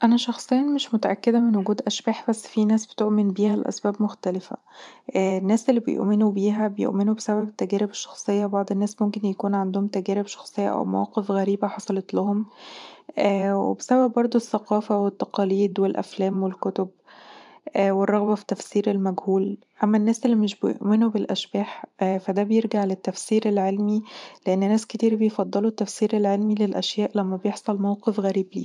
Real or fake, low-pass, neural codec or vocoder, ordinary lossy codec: fake; 10.8 kHz; vocoder, 44.1 kHz, 128 mel bands every 512 samples, BigVGAN v2; none